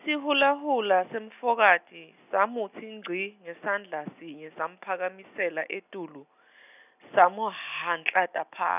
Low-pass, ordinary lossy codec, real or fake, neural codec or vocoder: 3.6 kHz; none; real; none